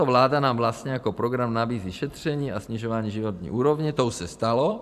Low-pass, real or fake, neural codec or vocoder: 14.4 kHz; fake; vocoder, 44.1 kHz, 128 mel bands every 512 samples, BigVGAN v2